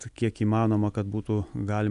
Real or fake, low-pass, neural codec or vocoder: real; 10.8 kHz; none